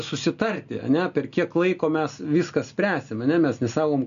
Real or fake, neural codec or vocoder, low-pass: real; none; 7.2 kHz